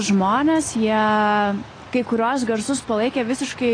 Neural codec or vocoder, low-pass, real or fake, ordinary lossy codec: none; 9.9 kHz; real; AAC, 32 kbps